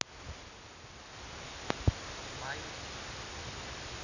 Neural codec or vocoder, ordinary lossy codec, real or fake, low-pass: none; none; real; 7.2 kHz